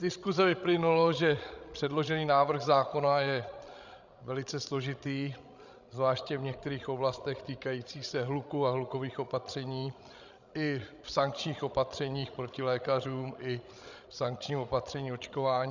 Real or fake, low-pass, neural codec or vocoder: fake; 7.2 kHz; codec, 16 kHz, 16 kbps, FreqCodec, larger model